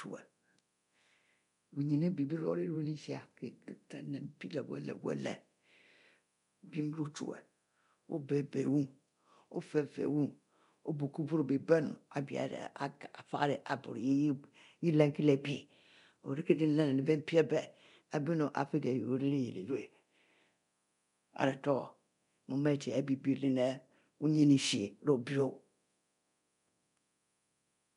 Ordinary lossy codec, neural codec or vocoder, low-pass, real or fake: none; codec, 24 kHz, 0.9 kbps, DualCodec; 10.8 kHz; fake